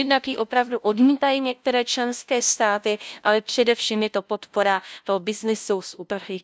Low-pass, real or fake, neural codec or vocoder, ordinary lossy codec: none; fake; codec, 16 kHz, 0.5 kbps, FunCodec, trained on LibriTTS, 25 frames a second; none